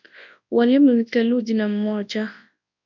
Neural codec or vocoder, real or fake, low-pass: codec, 24 kHz, 0.9 kbps, WavTokenizer, large speech release; fake; 7.2 kHz